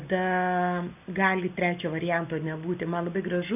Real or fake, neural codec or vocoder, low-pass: real; none; 3.6 kHz